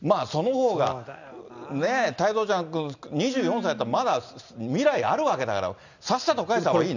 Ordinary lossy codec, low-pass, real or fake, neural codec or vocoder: none; 7.2 kHz; real; none